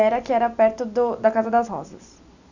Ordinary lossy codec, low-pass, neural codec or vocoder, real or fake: none; 7.2 kHz; none; real